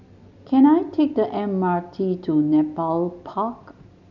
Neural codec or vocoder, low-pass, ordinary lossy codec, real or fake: none; 7.2 kHz; none; real